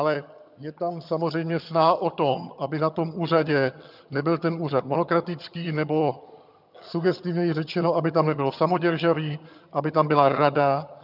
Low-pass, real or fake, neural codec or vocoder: 5.4 kHz; fake; vocoder, 22.05 kHz, 80 mel bands, HiFi-GAN